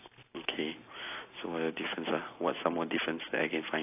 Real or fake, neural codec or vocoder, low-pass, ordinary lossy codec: real; none; 3.6 kHz; AAC, 24 kbps